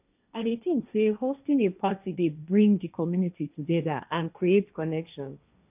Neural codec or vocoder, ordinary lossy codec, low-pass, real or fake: codec, 16 kHz, 1.1 kbps, Voila-Tokenizer; none; 3.6 kHz; fake